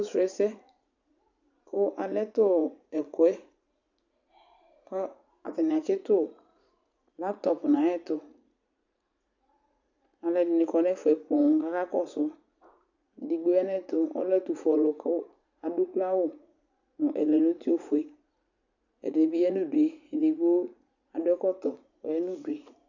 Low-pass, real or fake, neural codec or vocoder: 7.2 kHz; fake; vocoder, 44.1 kHz, 80 mel bands, Vocos